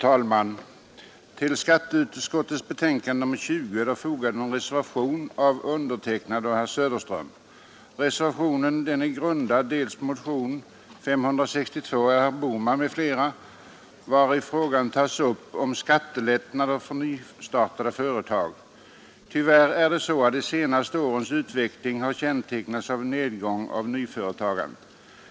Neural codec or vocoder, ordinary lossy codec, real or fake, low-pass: none; none; real; none